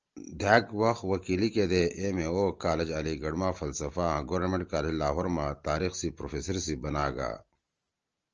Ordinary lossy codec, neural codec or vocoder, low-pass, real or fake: Opus, 24 kbps; none; 7.2 kHz; real